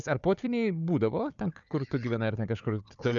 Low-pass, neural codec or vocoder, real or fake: 7.2 kHz; codec, 16 kHz, 4 kbps, FunCodec, trained on Chinese and English, 50 frames a second; fake